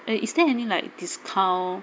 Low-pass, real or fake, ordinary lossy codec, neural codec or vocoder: none; real; none; none